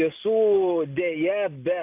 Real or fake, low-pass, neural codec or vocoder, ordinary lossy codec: real; 3.6 kHz; none; AAC, 32 kbps